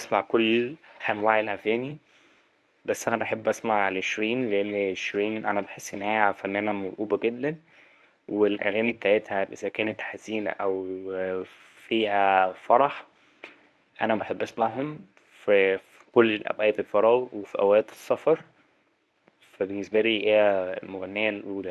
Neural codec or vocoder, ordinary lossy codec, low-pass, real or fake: codec, 24 kHz, 0.9 kbps, WavTokenizer, medium speech release version 1; none; none; fake